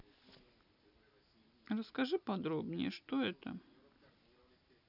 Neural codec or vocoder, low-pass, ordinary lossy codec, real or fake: none; 5.4 kHz; none; real